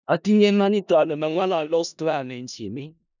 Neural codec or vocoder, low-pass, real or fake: codec, 16 kHz in and 24 kHz out, 0.4 kbps, LongCat-Audio-Codec, four codebook decoder; 7.2 kHz; fake